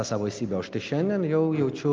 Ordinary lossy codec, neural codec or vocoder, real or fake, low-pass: Opus, 24 kbps; none; real; 7.2 kHz